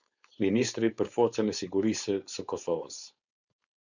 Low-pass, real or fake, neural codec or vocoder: 7.2 kHz; fake; codec, 16 kHz, 4.8 kbps, FACodec